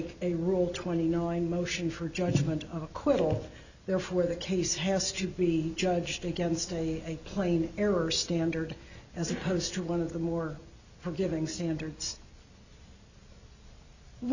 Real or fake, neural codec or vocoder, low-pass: real; none; 7.2 kHz